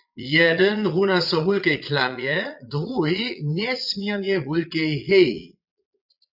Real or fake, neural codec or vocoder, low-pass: fake; vocoder, 22.05 kHz, 80 mel bands, Vocos; 5.4 kHz